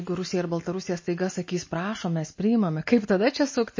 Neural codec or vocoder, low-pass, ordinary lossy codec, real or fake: none; 7.2 kHz; MP3, 32 kbps; real